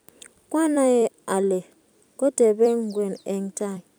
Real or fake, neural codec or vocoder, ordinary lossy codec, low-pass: fake; vocoder, 44.1 kHz, 128 mel bands every 256 samples, BigVGAN v2; none; none